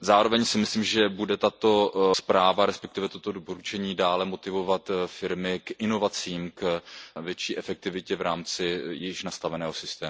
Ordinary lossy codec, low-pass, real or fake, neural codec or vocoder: none; none; real; none